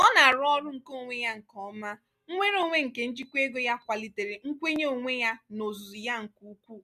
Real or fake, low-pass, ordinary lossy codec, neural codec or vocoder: real; 14.4 kHz; none; none